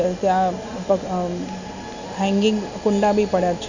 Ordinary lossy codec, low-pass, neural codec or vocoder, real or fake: none; 7.2 kHz; none; real